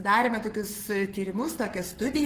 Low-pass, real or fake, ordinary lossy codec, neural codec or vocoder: 14.4 kHz; fake; Opus, 16 kbps; codec, 44.1 kHz, 7.8 kbps, Pupu-Codec